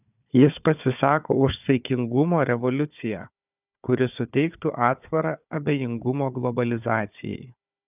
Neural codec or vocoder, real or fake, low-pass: codec, 16 kHz, 4 kbps, FunCodec, trained on Chinese and English, 50 frames a second; fake; 3.6 kHz